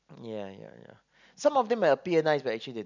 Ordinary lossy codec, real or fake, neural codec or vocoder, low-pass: none; real; none; 7.2 kHz